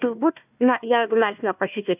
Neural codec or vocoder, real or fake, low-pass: codec, 16 kHz, 1 kbps, FunCodec, trained on Chinese and English, 50 frames a second; fake; 3.6 kHz